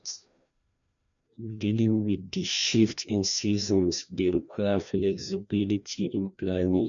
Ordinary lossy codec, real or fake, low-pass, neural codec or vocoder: none; fake; 7.2 kHz; codec, 16 kHz, 1 kbps, FreqCodec, larger model